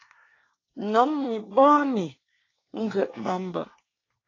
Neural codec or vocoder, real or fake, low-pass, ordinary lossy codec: codec, 24 kHz, 1 kbps, SNAC; fake; 7.2 kHz; AAC, 32 kbps